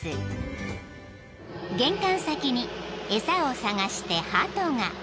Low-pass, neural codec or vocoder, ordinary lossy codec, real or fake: none; none; none; real